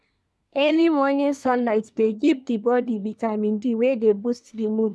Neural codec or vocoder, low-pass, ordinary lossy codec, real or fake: codec, 24 kHz, 1 kbps, SNAC; none; none; fake